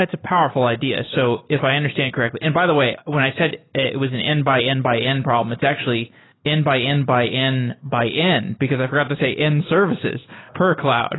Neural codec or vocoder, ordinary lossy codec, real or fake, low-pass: none; AAC, 16 kbps; real; 7.2 kHz